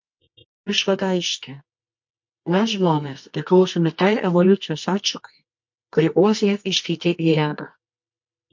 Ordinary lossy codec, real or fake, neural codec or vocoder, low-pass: MP3, 48 kbps; fake; codec, 24 kHz, 0.9 kbps, WavTokenizer, medium music audio release; 7.2 kHz